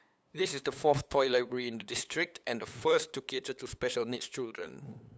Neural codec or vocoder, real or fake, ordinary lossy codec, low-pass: codec, 16 kHz, 8 kbps, FunCodec, trained on LibriTTS, 25 frames a second; fake; none; none